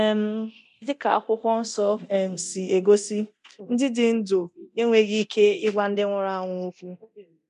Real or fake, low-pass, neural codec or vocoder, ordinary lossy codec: fake; 10.8 kHz; codec, 24 kHz, 0.9 kbps, DualCodec; MP3, 96 kbps